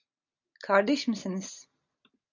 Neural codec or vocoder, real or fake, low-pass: none; real; 7.2 kHz